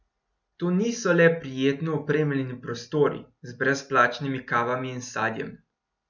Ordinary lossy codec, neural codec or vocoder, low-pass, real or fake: none; none; 7.2 kHz; real